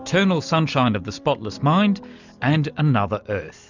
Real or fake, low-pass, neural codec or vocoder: real; 7.2 kHz; none